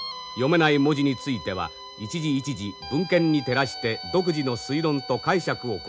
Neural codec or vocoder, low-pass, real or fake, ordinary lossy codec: none; none; real; none